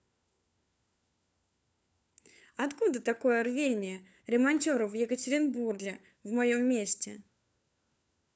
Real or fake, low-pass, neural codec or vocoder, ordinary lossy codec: fake; none; codec, 16 kHz, 4 kbps, FunCodec, trained on LibriTTS, 50 frames a second; none